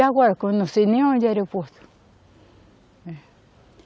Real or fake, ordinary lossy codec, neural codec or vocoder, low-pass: real; none; none; none